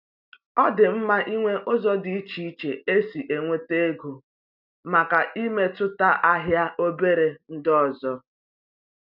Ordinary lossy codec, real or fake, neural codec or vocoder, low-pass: none; real; none; 5.4 kHz